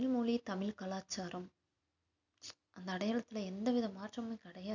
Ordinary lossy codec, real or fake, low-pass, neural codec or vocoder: none; real; 7.2 kHz; none